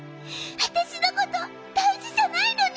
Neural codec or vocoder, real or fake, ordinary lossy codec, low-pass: none; real; none; none